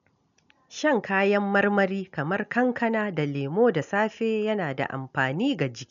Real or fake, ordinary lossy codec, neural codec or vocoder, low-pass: real; none; none; 7.2 kHz